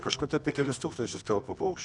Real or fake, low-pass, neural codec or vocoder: fake; 10.8 kHz; codec, 24 kHz, 0.9 kbps, WavTokenizer, medium music audio release